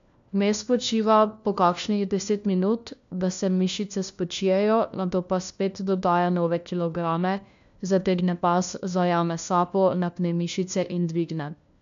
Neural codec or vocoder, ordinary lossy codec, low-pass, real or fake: codec, 16 kHz, 1 kbps, FunCodec, trained on LibriTTS, 50 frames a second; MP3, 64 kbps; 7.2 kHz; fake